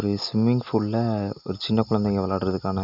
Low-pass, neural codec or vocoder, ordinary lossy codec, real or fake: 5.4 kHz; none; none; real